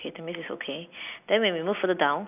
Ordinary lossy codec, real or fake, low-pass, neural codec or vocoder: none; real; 3.6 kHz; none